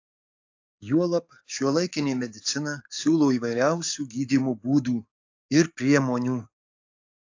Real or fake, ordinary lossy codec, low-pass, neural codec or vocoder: fake; AAC, 48 kbps; 7.2 kHz; codec, 44.1 kHz, 7.8 kbps, DAC